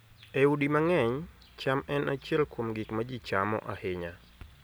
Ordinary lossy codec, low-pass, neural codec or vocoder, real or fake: none; none; none; real